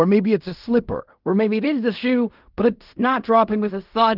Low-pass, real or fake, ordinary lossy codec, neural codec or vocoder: 5.4 kHz; fake; Opus, 24 kbps; codec, 16 kHz in and 24 kHz out, 0.4 kbps, LongCat-Audio-Codec, fine tuned four codebook decoder